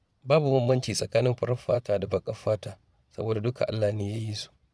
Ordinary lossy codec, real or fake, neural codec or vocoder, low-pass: none; fake; vocoder, 22.05 kHz, 80 mel bands, Vocos; none